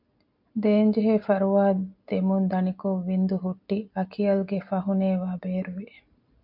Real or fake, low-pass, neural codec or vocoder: real; 5.4 kHz; none